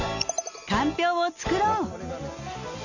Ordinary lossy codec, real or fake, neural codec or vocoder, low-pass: none; real; none; 7.2 kHz